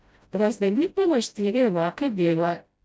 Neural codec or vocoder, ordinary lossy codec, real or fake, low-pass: codec, 16 kHz, 0.5 kbps, FreqCodec, smaller model; none; fake; none